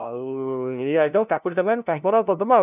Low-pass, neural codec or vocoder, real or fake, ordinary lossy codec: 3.6 kHz; codec, 16 kHz, 0.5 kbps, FunCodec, trained on LibriTTS, 25 frames a second; fake; none